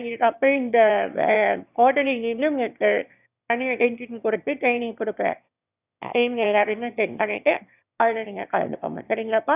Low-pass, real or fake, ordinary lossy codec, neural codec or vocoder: 3.6 kHz; fake; none; autoencoder, 22.05 kHz, a latent of 192 numbers a frame, VITS, trained on one speaker